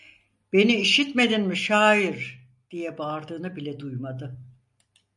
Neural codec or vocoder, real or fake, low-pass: none; real; 10.8 kHz